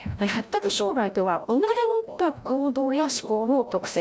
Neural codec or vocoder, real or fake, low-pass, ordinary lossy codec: codec, 16 kHz, 0.5 kbps, FreqCodec, larger model; fake; none; none